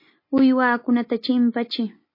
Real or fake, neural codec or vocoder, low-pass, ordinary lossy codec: real; none; 5.4 kHz; MP3, 24 kbps